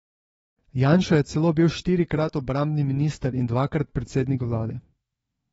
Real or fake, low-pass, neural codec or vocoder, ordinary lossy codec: fake; 19.8 kHz; autoencoder, 48 kHz, 128 numbers a frame, DAC-VAE, trained on Japanese speech; AAC, 24 kbps